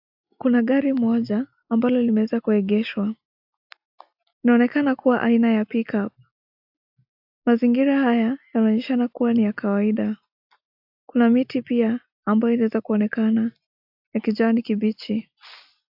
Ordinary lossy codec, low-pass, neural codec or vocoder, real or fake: AAC, 48 kbps; 5.4 kHz; none; real